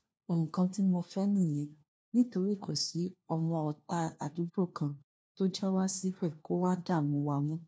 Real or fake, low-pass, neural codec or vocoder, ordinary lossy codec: fake; none; codec, 16 kHz, 1 kbps, FunCodec, trained on LibriTTS, 50 frames a second; none